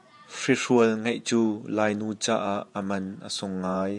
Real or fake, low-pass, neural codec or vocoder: fake; 10.8 kHz; vocoder, 48 kHz, 128 mel bands, Vocos